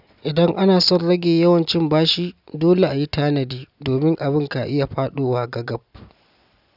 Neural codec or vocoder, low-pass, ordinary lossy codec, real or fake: none; 5.4 kHz; none; real